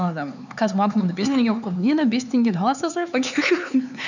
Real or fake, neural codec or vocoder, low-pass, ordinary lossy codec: fake; codec, 16 kHz, 4 kbps, X-Codec, HuBERT features, trained on LibriSpeech; 7.2 kHz; none